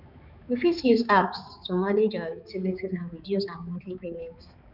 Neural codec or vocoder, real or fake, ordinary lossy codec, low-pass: codec, 16 kHz, 4 kbps, X-Codec, HuBERT features, trained on balanced general audio; fake; none; 5.4 kHz